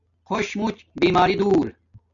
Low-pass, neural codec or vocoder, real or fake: 7.2 kHz; none; real